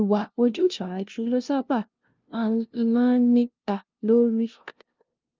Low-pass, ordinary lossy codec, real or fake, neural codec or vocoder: 7.2 kHz; Opus, 24 kbps; fake; codec, 16 kHz, 0.5 kbps, FunCodec, trained on LibriTTS, 25 frames a second